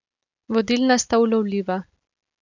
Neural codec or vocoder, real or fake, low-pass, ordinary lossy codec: none; real; 7.2 kHz; none